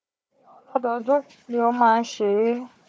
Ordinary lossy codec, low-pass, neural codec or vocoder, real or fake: none; none; codec, 16 kHz, 4 kbps, FunCodec, trained on Chinese and English, 50 frames a second; fake